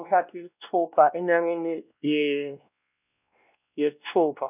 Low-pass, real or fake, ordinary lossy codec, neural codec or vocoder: 3.6 kHz; fake; none; codec, 16 kHz, 1 kbps, X-Codec, WavLM features, trained on Multilingual LibriSpeech